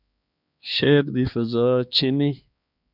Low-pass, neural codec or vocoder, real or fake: 5.4 kHz; codec, 16 kHz, 2 kbps, X-Codec, HuBERT features, trained on balanced general audio; fake